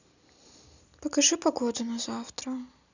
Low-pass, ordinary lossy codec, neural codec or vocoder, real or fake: 7.2 kHz; none; none; real